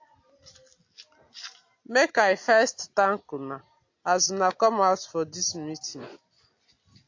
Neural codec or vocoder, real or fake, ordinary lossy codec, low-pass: none; real; AAC, 48 kbps; 7.2 kHz